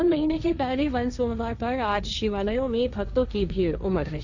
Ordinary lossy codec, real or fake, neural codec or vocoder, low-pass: none; fake; codec, 16 kHz, 1.1 kbps, Voila-Tokenizer; 7.2 kHz